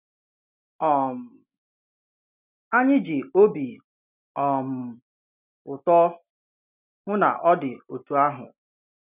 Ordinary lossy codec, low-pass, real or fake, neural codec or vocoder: none; 3.6 kHz; real; none